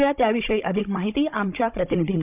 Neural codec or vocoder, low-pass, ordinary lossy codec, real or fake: codec, 16 kHz, 16 kbps, FunCodec, trained on Chinese and English, 50 frames a second; 3.6 kHz; none; fake